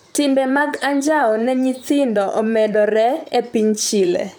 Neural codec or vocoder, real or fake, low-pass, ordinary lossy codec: vocoder, 44.1 kHz, 128 mel bands, Pupu-Vocoder; fake; none; none